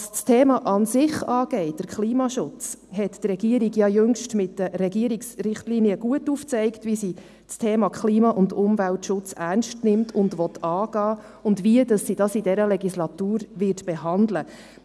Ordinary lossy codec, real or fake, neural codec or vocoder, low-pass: none; real; none; none